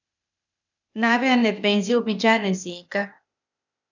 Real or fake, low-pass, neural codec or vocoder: fake; 7.2 kHz; codec, 16 kHz, 0.8 kbps, ZipCodec